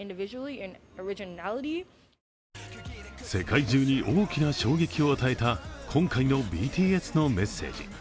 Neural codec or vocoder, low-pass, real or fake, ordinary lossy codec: none; none; real; none